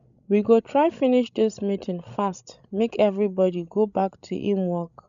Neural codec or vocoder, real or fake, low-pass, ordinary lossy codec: codec, 16 kHz, 16 kbps, FreqCodec, larger model; fake; 7.2 kHz; none